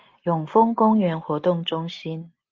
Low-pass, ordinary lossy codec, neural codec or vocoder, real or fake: 7.2 kHz; Opus, 32 kbps; none; real